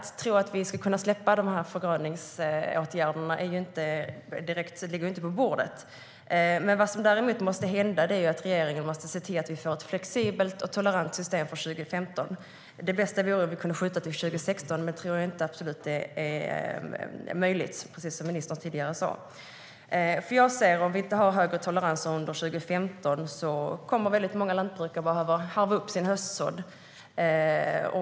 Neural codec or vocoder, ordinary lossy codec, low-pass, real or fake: none; none; none; real